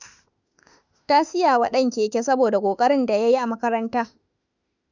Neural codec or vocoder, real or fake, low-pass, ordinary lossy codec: autoencoder, 48 kHz, 32 numbers a frame, DAC-VAE, trained on Japanese speech; fake; 7.2 kHz; none